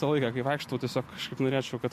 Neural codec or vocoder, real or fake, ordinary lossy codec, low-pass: none; real; MP3, 64 kbps; 14.4 kHz